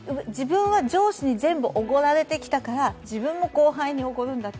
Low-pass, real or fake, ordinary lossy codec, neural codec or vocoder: none; real; none; none